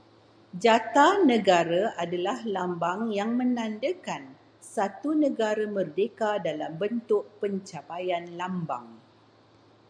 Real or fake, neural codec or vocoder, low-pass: real; none; 9.9 kHz